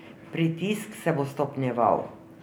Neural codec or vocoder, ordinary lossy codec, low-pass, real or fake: none; none; none; real